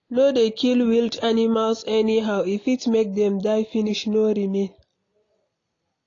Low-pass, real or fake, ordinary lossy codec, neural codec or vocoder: 7.2 kHz; real; AAC, 32 kbps; none